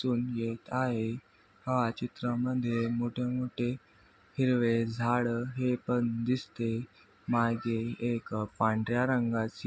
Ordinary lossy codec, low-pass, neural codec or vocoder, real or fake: none; none; none; real